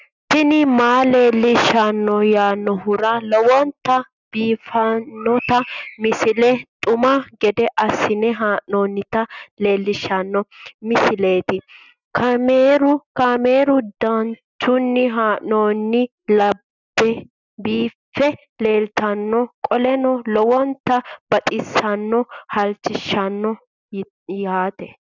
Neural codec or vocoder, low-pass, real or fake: none; 7.2 kHz; real